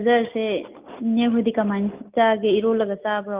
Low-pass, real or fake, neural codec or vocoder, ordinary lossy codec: 3.6 kHz; real; none; Opus, 32 kbps